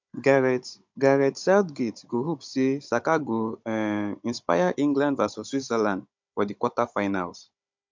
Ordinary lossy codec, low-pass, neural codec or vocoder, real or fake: MP3, 64 kbps; 7.2 kHz; codec, 16 kHz, 16 kbps, FunCodec, trained on Chinese and English, 50 frames a second; fake